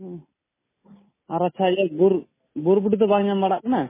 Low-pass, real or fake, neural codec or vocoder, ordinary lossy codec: 3.6 kHz; real; none; MP3, 16 kbps